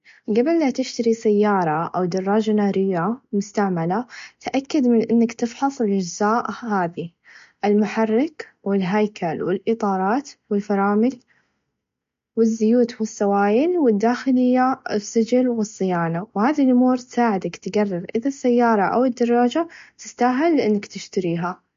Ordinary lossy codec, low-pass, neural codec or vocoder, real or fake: MP3, 48 kbps; 7.2 kHz; none; real